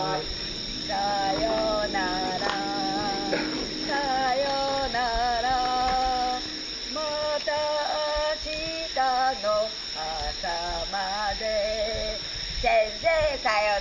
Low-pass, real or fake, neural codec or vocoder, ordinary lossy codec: 7.2 kHz; real; none; none